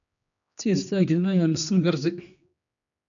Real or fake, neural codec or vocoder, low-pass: fake; codec, 16 kHz, 2 kbps, X-Codec, HuBERT features, trained on general audio; 7.2 kHz